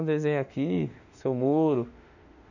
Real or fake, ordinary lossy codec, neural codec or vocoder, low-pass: fake; none; autoencoder, 48 kHz, 32 numbers a frame, DAC-VAE, trained on Japanese speech; 7.2 kHz